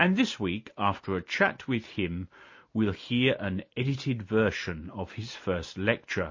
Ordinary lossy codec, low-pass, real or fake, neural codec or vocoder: MP3, 32 kbps; 7.2 kHz; real; none